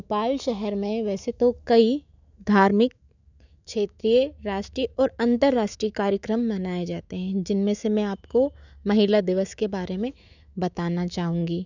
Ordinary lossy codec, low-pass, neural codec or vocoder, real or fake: none; 7.2 kHz; autoencoder, 48 kHz, 128 numbers a frame, DAC-VAE, trained on Japanese speech; fake